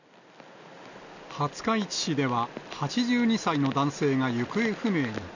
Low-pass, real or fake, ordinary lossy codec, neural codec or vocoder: 7.2 kHz; real; none; none